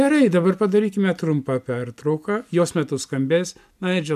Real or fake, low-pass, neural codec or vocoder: real; 14.4 kHz; none